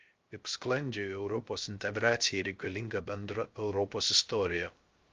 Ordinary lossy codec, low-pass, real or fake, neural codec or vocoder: Opus, 32 kbps; 7.2 kHz; fake; codec, 16 kHz, 0.3 kbps, FocalCodec